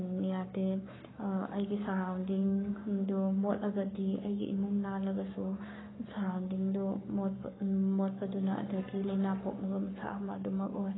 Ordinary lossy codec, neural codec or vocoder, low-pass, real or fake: AAC, 16 kbps; codec, 44.1 kHz, 7.8 kbps, Pupu-Codec; 7.2 kHz; fake